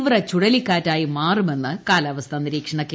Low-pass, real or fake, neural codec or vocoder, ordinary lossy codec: none; real; none; none